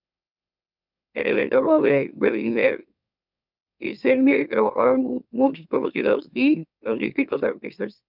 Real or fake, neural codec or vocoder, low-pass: fake; autoencoder, 44.1 kHz, a latent of 192 numbers a frame, MeloTTS; 5.4 kHz